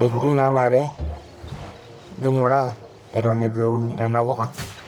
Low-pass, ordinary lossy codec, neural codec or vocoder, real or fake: none; none; codec, 44.1 kHz, 1.7 kbps, Pupu-Codec; fake